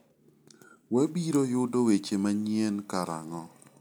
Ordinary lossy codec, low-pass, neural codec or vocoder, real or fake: none; none; none; real